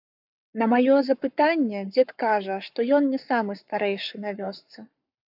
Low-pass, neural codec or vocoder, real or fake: 5.4 kHz; codec, 44.1 kHz, 7.8 kbps, Pupu-Codec; fake